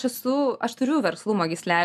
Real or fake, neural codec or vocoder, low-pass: real; none; 14.4 kHz